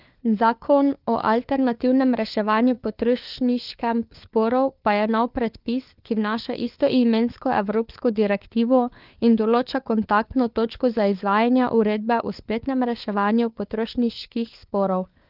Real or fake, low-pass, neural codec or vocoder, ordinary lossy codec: fake; 5.4 kHz; codec, 16 kHz, 4 kbps, FunCodec, trained on LibriTTS, 50 frames a second; Opus, 24 kbps